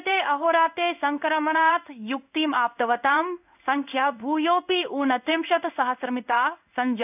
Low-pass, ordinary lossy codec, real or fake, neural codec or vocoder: 3.6 kHz; none; fake; codec, 16 kHz in and 24 kHz out, 1 kbps, XY-Tokenizer